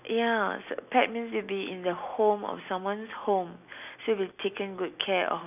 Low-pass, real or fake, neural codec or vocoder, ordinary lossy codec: 3.6 kHz; real; none; none